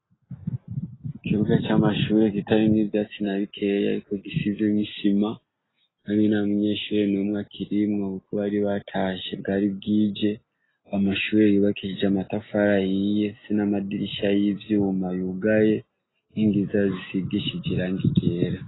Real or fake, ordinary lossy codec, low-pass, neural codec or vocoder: real; AAC, 16 kbps; 7.2 kHz; none